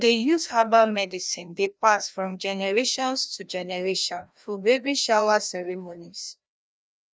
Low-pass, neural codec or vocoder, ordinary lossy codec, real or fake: none; codec, 16 kHz, 1 kbps, FreqCodec, larger model; none; fake